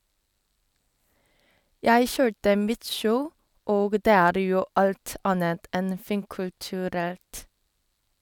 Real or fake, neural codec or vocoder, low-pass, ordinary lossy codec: real; none; 19.8 kHz; none